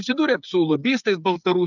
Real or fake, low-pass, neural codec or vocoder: fake; 7.2 kHz; codec, 16 kHz, 8 kbps, FreqCodec, smaller model